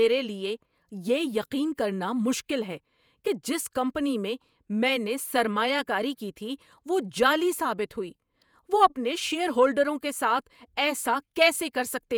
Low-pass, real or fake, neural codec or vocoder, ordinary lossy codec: none; fake; vocoder, 48 kHz, 128 mel bands, Vocos; none